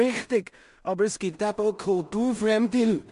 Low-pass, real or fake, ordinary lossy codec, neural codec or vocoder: 10.8 kHz; fake; MP3, 64 kbps; codec, 16 kHz in and 24 kHz out, 0.4 kbps, LongCat-Audio-Codec, two codebook decoder